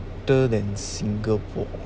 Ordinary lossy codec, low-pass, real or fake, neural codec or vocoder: none; none; real; none